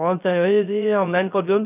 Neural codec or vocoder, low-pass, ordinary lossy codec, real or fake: codec, 16 kHz, 0.3 kbps, FocalCodec; 3.6 kHz; none; fake